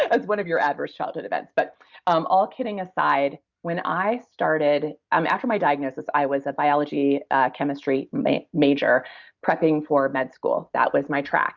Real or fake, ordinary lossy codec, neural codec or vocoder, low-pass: real; Opus, 64 kbps; none; 7.2 kHz